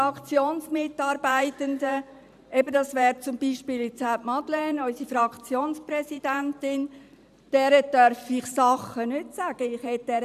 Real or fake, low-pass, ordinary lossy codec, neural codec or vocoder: fake; 14.4 kHz; none; vocoder, 44.1 kHz, 128 mel bands every 512 samples, BigVGAN v2